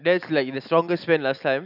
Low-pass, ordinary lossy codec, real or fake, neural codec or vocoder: 5.4 kHz; none; real; none